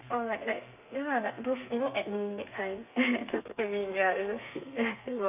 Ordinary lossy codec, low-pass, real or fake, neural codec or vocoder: none; 3.6 kHz; fake; codec, 32 kHz, 1.9 kbps, SNAC